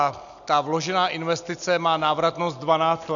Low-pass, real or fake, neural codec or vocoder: 7.2 kHz; real; none